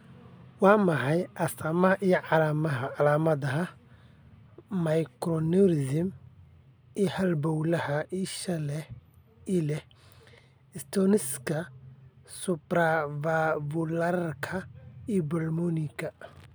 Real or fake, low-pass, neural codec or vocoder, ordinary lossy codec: real; none; none; none